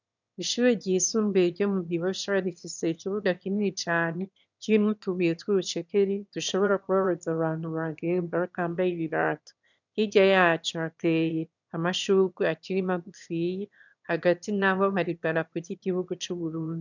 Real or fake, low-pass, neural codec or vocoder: fake; 7.2 kHz; autoencoder, 22.05 kHz, a latent of 192 numbers a frame, VITS, trained on one speaker